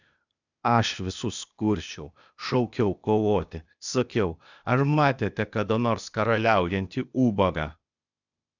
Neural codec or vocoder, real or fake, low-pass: codec, 16 kHz, 0.8 kbps, ZipCodec; fake; 7.2 kHz